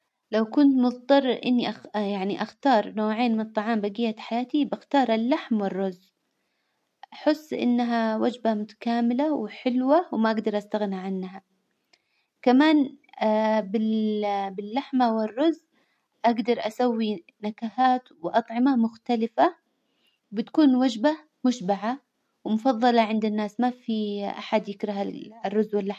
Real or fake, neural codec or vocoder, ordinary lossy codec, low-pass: real; none; MP3, 64 kbps; 14.4 kHz